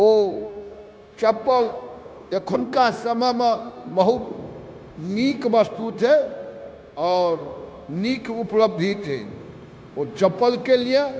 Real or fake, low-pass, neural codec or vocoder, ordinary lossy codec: fake; none; codec, 16 kHz, 0.9 kbps, LongCat-Audio-Codec; none